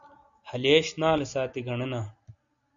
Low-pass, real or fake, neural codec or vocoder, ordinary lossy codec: 7.2 kHz; real; none; AAC, 64 kbps